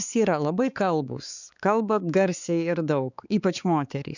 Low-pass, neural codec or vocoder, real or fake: 7.2 kHz; codec, 16 kHz, 4 kbps, X-Codec, HuBERT features, trained on balanced general audio; fake